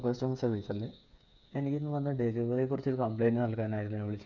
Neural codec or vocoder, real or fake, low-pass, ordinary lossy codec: codec, 16 kHz, 8 kbps, FreqCodec, smaller model; fake; 7.2 kHz; none